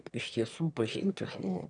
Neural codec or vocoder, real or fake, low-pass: autoencoder, 22.05 kHz, a latent of 192 numbers a frame, VITS, trained on one speaker; fake; 9.9 kHz